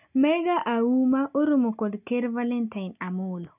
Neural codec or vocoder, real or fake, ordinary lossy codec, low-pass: none; real; none; 3.6 kHz